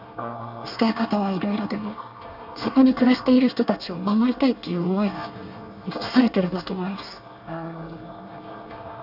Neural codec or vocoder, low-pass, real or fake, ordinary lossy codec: codec, 24 kHz, 1 kbps, SNAC; 5.4 kHz; fake; none